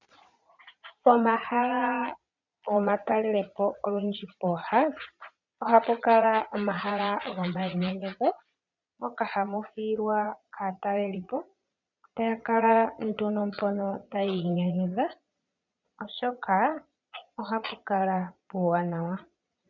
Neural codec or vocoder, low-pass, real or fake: vocoder, 22.05 kHz, 80 mel bands, Vocos; 7.2 kHz; fake